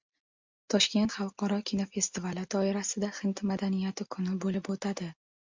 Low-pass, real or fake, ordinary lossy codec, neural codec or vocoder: 7.2 kHz; real; MP3, 64 kbps; none